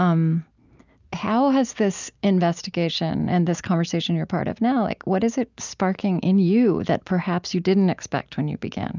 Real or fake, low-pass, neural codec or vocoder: real; 7.2 kHz; none